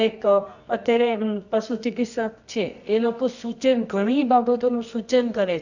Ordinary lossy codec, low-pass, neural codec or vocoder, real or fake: none; 7.2 kHz; codec, 24 kHz, 0.9 kbps, WavTokenizer, medium music audio release; fake